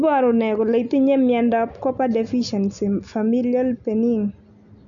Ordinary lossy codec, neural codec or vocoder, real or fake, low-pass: none; none; real; 7.2 kHz